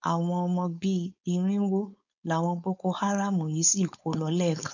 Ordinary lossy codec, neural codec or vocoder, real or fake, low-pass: none; codec, 16 kHz, 4.8 kbps, FACodec; fake; 7.2 kHz